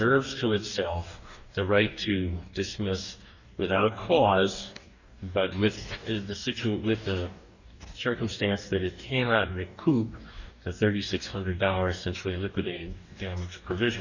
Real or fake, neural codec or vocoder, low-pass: fake; codec, 44.1 kHz, 2.6 kbps, DAC; 7.2 kHz